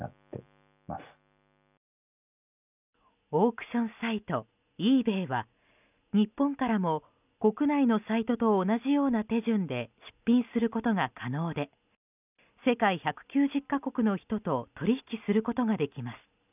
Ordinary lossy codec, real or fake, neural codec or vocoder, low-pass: none; real; none; 3.6 kHz